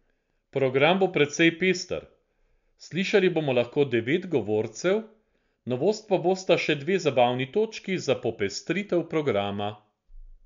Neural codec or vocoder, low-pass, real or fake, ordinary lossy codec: none; 7.2 kHz; real; MP3, 64 kbps